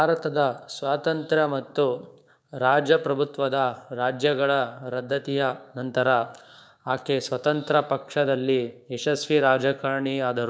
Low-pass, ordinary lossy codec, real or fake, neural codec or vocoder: none; none; fake; codec, 16 kHz, 6 kbps, DAC